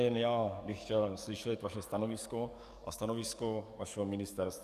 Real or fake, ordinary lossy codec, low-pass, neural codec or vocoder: fake; Opus, 64 kbps; 14.4 kHz; codec, 44.1 kHz, 7.8 kbps, DAC